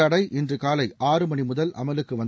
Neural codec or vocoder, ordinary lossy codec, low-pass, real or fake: none; none; none; real